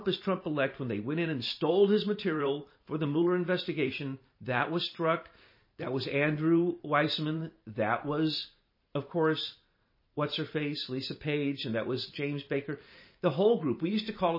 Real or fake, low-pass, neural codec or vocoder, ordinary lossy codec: fake; 5.4 kHz; vocoder, 44.1 kHz, 128 mel bands every 512 samples, BigVGAN v2; MP3, 24 kbps